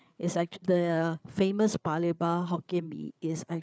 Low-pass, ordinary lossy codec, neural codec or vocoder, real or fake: none; none; codec, 16 kHz, 8 kbps, FreqCodec, larger model; fake